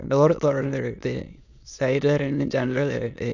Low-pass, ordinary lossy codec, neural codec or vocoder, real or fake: 7.2 kHz; none; autoencoder, 22.05 kHz, a latent of 192 numbers a frame, VITS, trained on many speakers; fake